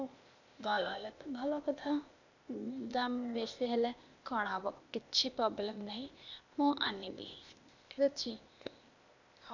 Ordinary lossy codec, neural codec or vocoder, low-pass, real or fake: none; codec, 16 kHz, 0.8 kbps, ZipCodec; 7.2 kHz; fake